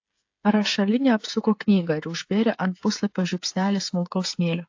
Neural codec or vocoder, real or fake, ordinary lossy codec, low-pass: codec, 16 kHz, 16 kbps, FreqCodec, smaller model; fake; AAC, 48 kbps; 7.2 kHz